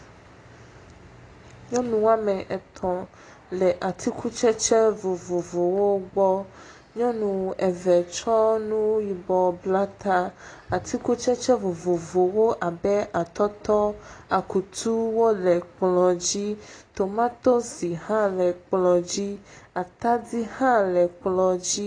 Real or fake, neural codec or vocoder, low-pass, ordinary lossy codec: real; none; 9.9 kHz; AAC, 32 kbps